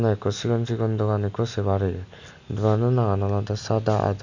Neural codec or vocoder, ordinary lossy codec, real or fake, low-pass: none; none; real; 7.2 kHz